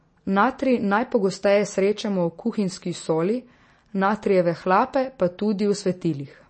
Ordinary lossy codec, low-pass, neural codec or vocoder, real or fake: MP3, 32 kbps; 9.9 kHz; none; real